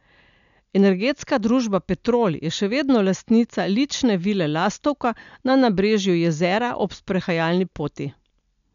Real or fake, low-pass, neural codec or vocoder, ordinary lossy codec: real; 7.2 kHz; none; none